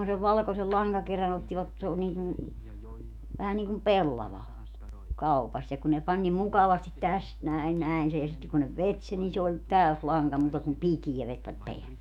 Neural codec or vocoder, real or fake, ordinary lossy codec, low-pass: autoencoder, 48 kHz, 128 numbers a frame, DAC-VAE, trained on Japanese speech; fake; none; 19.8 kHz